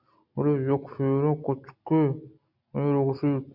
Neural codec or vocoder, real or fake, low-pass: none; real; 5.4 kHz